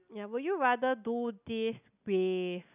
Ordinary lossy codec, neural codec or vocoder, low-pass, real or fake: none; none; 3.6 kHz; real